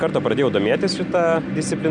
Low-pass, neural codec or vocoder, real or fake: 9.9 kHz; none; real